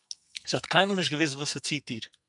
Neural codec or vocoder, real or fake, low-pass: codec, 44.1 kHz, 2.6 kbps, SNAC; fake; 10.8 kHz